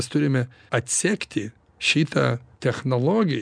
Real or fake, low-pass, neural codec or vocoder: real; 9.9 kHz; none